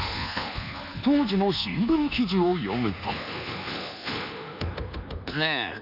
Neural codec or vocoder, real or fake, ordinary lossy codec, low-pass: codec, 24 kHz, 1.2 kbps, DualCodec; fake; none; 5.4 kHz